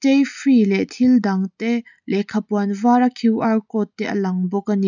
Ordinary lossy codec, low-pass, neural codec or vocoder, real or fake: none; 7.2 kHz; none; real